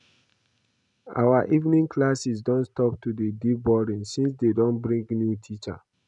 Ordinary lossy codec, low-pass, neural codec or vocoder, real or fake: none; 10.8 kHz; none; real